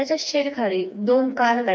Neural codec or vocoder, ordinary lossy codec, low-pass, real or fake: codec, 16 kHz, 2 kbps, FreqCodec, smaller model; none; none; fake